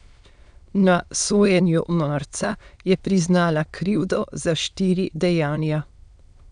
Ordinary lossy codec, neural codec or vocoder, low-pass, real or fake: none; autoencoder, 22.05 kHz, a latent of 192 numbers a frame, VITS, trained on many speakers; 9.9 kHz; fake